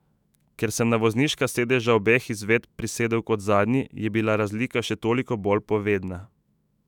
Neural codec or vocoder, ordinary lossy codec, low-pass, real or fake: autoencoder, 48 kHz, 128 numbers a frame, DAC-VAE, trained on Japanese speech; none; 19.8 kHz; fake